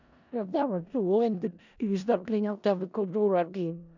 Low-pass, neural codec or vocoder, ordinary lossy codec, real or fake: 7.2 kHz; codec, 16 kHz in and 24 kHz out, 0.4 kbps, LongCat-Audio-Codec, four codebook decoder; none; fake